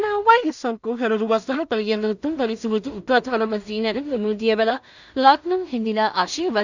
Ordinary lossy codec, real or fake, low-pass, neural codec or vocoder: none; fake; 7.2 kHz; codec, 16 kHz in and 24 kHz out, 0.4 kbps, LongCat-Audio-Codec, two codebook decoder